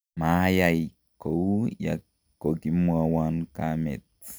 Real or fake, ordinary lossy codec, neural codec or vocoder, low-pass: real; none; none; none